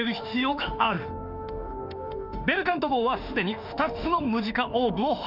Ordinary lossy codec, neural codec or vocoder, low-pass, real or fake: none; autoencoder, 48 kHz, 32 numbers a frame, DAC-VAE, trained on Japanese speech; 5.4 kHz; fake